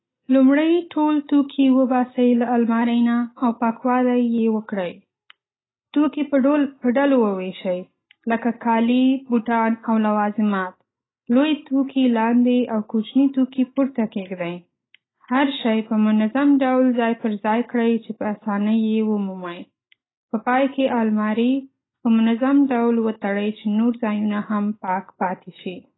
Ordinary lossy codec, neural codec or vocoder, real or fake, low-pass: AAC, 16 kbps; none; real; 7.2 kHz